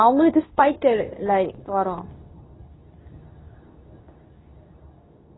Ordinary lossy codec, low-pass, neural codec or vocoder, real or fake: AAC, 16 kbps; 7.2 kHz; codec, 24 kHz, 0.9 kbps, WavTokenizer, medium speech release version 2; fake